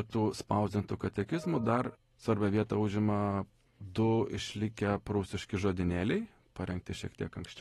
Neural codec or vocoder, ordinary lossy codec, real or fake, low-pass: none; AAC, 32 kbps; real; 19.8 kHz